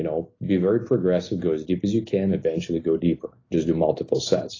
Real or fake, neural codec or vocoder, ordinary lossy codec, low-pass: real; none; AAC, 32 kbps; 7.2 kHz